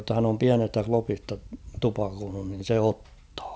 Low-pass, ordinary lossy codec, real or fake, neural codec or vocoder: none; none; real; none